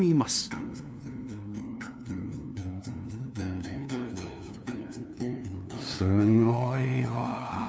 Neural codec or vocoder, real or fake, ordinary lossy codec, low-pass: codec, 16 kHz, 2 kbps, FunCodec, trained on LibriTTS, 25 frames a second; fake; none; none